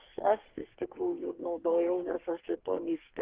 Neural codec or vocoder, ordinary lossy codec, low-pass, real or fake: codec, 44.1 kHz, 2.6 kbps, SNAC; Opus, 16 kbps; 3.6 kHz; fake